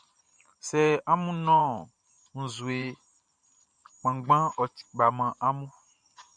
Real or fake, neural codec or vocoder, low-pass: fake; vocoder, 44.1 kHz, 128 mel bands every 512 samples, BigVGAN v2; 9.9 kHz